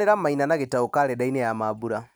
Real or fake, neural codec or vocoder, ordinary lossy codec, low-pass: real; none; none; none